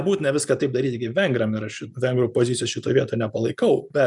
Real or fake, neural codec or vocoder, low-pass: real; none; 10.8 kHz